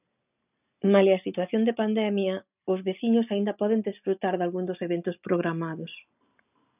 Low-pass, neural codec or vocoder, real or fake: 3.6 kHz; none; real